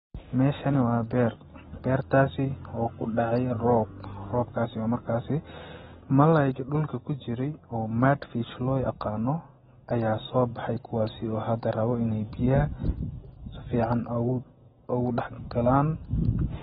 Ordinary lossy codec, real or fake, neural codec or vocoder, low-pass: AAC, 16 kbps; real; none; 19.8 kHz